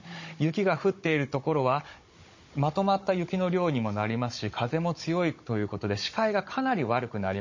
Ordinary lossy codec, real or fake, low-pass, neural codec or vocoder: MP3, 32 kbps; fake; 7.2 kHz; codec, 16 kHz, 16 kbps, FunCodec, trained on Chinese and English, 50 frames a second